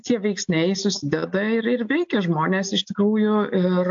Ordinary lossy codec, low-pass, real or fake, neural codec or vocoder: AAC, 64 kbps; 7.2 kHz; real; none